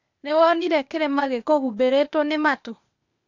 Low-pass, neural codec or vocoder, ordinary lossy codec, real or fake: 7.2 kHz; codec, 16 kHz, 0.8 kbps, ZipCodec; none; fake